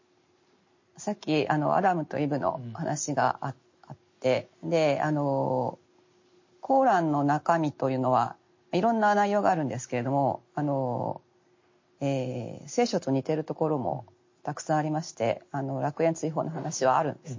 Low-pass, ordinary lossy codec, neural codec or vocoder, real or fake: 7.2 kHz; MP3, 32 kbps; none; real